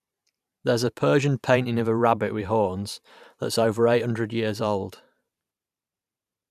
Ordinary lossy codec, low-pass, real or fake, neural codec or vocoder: none; 14.4 kHz; fake; vocoder, 44.1 kHz, 128 mel bands every 512 samples, BigVGAN v2